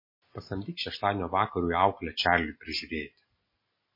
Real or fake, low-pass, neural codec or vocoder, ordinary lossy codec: real; 5.4 kHz; none; MP3, 24 kbps